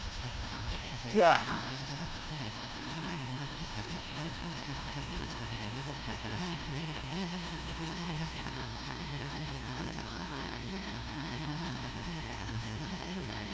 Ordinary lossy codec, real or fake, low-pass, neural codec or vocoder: none; fake; none; codec, 16 kHz, 0.5 kbps, FunCodec, trained on LibriTTS, 25 frames a second